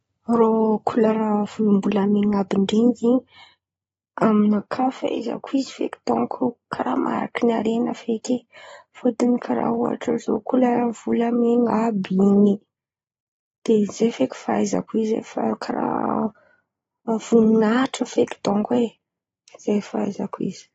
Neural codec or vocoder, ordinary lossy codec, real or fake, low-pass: none; AAC, 24 kbps; real; 19.8 kHz